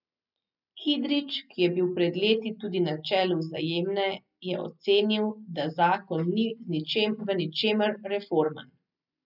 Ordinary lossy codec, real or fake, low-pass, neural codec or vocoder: none; real; 5.4 kHz; none